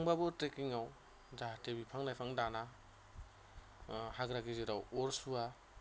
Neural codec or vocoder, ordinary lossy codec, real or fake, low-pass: none; none; real; none